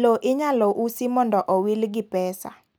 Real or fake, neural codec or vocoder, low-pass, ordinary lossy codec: real; none; none; none